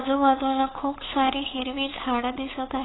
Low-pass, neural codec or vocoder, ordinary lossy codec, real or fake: 7.2 kHz; codec, 16 kHz, 8 kbps, FunCodec, trained on LibriTTS, 25 frames a second; AAC, 16 kbps; fake